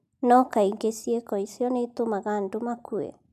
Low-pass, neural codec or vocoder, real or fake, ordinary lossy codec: 14.4 kHz; none; real; none